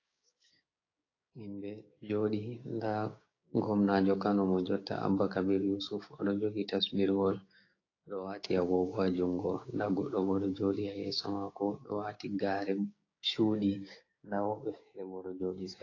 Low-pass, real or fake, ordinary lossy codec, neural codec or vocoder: 7.2 kHz; fake; AAC, 32 kbps; codec, 16 kHz, 6 kbps, DAC